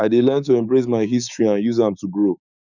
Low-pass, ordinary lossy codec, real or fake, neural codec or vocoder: 7.2 kHz; none; fake; codec, 16 kHz, 6 kbps, DAC